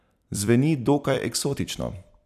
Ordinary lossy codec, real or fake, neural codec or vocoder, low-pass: none; fake; vocoder, 44.1 kHz, 128 mel bands every 256 samples, BigVGAN v2; 14.4 kHz